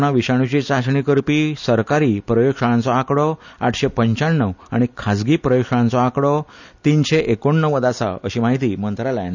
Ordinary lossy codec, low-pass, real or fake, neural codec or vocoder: none; 7.2 kHz; real; none